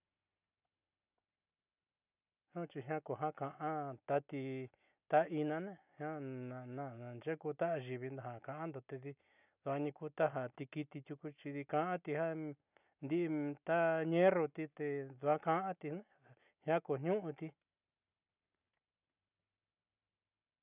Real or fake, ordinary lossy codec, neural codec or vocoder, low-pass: real; none; none; 3.6 kHz